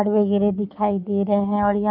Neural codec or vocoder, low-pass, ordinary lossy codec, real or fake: codec, 44.1 kHz, 7.8 kbps, Pupu-Codec; 5.4 kHz; none; fake